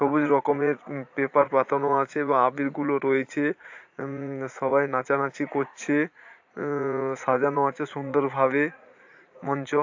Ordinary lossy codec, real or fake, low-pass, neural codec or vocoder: AAC, 48 kbps; fake; 7.2 kHz; vocoder, 44.1 kHz, 80 mel bands, Vocos